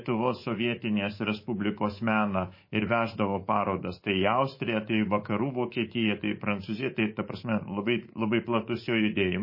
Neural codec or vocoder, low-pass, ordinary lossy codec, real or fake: codec, 24 kHz, 3.1 kbps, DualCodec; 5.4 kHz; MP3, 24 kbps; fake